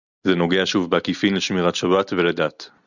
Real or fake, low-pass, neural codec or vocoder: real; 7.2 kHz; none